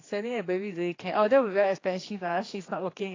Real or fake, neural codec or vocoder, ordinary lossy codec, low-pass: fake; codec, 16 kHz, 2 kbps, X-Codec, HuBERT features, trained on general audio; AAC, 32 kbps; 7.2 kHz